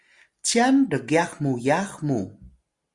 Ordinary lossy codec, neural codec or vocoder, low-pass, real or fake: Opus, 64 kbps; none; 10.8 kHz; real